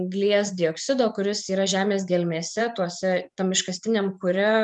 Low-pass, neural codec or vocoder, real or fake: 10.8 kHz; vocoder, 24 kHz, 100 mel bands, Vocos; fake